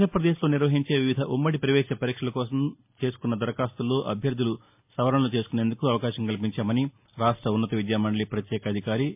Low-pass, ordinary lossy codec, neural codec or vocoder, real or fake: 3.6 kHz; MP3, 32 kbps; none; real